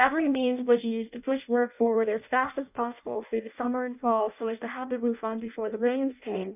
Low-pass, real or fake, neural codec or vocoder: 3.6 kHz; fake; codec, 16 kHz in and 24 kHz out, 0.6 kbps, FireRedTTS-2 codec